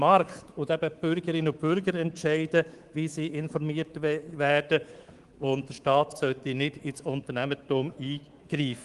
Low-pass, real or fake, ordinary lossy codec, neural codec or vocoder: 10.8 kHz; fake; Opus, 24 kbps; codec, 24 kHz, 3.1 kbps, DualCodec